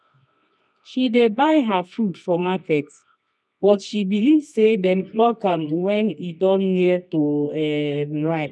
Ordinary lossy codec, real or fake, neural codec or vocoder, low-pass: none; fake; codec, 24 kHz, 0.9 kbps, WavTokenizer, medium music audio release; none